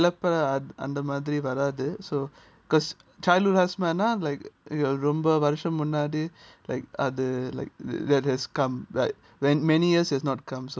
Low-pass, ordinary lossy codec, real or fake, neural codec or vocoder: none; none; real; none